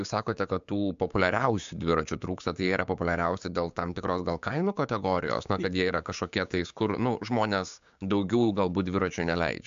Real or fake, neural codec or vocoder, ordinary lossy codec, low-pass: fake; codec, 16 kHz, 6 kbps, DAC; MP3, 64 kbps; 7.2 kHz